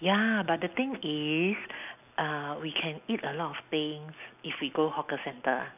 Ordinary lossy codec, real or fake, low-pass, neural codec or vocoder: none; real; 3.6 kHz; none